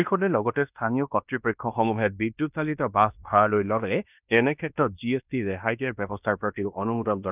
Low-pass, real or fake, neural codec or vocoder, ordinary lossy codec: 3.6 kHz; fake; codec, 16 kHz in and 24 kHz out, 0.9 kbps, LongCat-Audio-Codec, fine tuned four codebook decoder; none